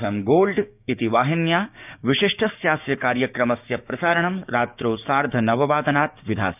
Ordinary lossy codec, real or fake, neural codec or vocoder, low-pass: none; fake; codec, 16 kHz, 6 kbps, DAC; 3.6 kHz